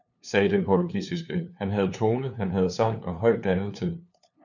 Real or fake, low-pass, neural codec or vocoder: fake; 7.2 kHz; codec, 16 kHz, 2 kbps, FunCodec, trained on LibriTTS, 25 frames a second